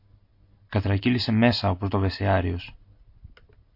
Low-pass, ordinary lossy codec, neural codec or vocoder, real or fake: 5.4 kHz; MP3, 32 kbps; none; real